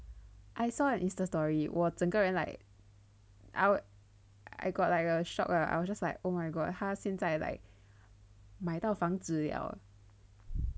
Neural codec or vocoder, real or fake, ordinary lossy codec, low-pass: none; real; none; none